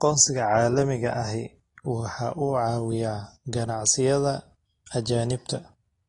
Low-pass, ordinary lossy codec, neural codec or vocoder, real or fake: 10.8 kHz; AAC, 32 kbps; none; real